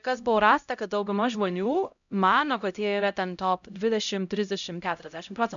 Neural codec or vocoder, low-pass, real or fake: codec, 16 kHz, 0.5 kbps, X-Codec, HuBERT features, trained on LibriSpeech; 7.2 kHz; fake